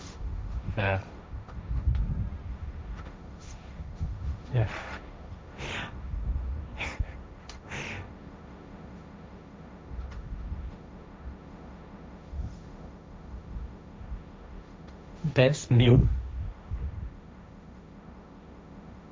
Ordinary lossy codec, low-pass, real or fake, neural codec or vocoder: none; none; fake; codec, 16 kHz, 1.1 kbps, Voila-Tokenizer